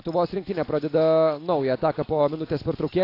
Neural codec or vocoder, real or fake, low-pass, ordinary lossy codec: none; real; 5.4 kHz; AAC, 32 kbps